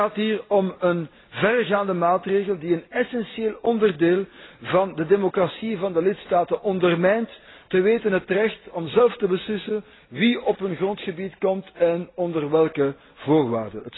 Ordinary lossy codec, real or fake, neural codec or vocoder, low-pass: AAC, 16 kbps; real; none; 7.2 kHz